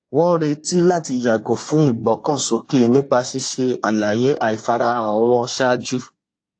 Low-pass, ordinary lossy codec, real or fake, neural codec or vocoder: 9.9 kHz; AAC, 48 kbps; fake; codec, 24 kHz, 1 kbps, SNAC